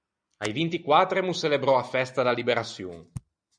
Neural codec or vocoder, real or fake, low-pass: none; real; 9.9 kHz